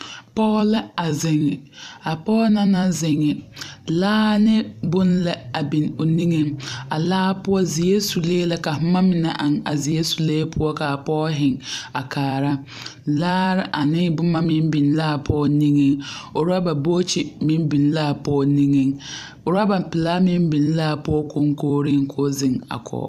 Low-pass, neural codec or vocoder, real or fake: 14.4 kHz; vocoder, 44.1 kHz, 128 mel bands every 256 samples, BigVGAN v2; fake